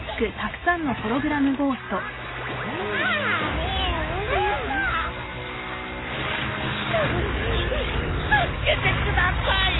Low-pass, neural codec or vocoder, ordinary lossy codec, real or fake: 7.2 kHz; none; AAC, 16 kbps; real